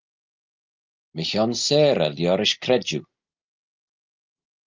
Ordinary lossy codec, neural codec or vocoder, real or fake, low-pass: Opus, 32 kbps; none; real; 7.2 kHz